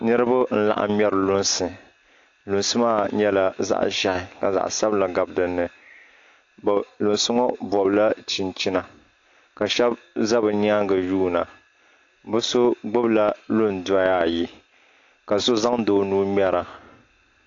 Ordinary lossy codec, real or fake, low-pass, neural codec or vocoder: AAC, 48 kbps; real; 7.2 kHz; none